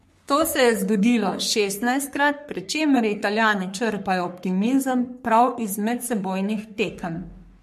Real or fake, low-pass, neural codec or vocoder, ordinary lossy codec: fake; 14.4 kHz; codec, 44.1 kHz, 3.4 kbps, Pupu-Codec; MP3, 64 kbps